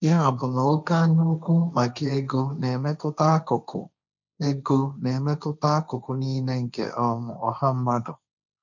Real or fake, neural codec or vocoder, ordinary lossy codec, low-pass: fake; codec, 16 kHz, 1.1 kbps, Voila-Tokenizer; none; 7.2 kHz